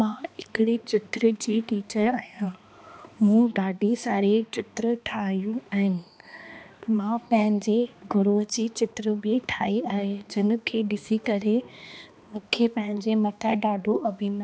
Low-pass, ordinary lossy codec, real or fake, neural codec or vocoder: none; none; fake; codec, 16 kHz, 2 kbps, X-Codec, HuBERT features, trained on balanced general audio